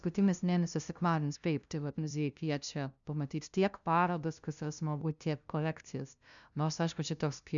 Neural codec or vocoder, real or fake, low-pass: codec, 16 kHz, 0.5 kbps, FunCodec, trained on LibriTTS, 25 frames a second; fake; 7.2 kHz